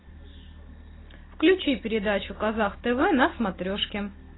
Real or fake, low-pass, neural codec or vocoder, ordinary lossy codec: real; 7.2 kHz; none; AAC, 16 kbps